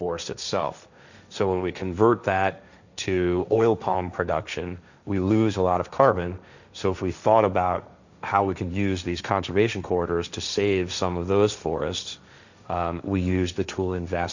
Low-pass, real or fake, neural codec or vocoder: 7.2 kHz; fake; codec, 16 kHz, 1.1 kbps, Voila-Tokenizer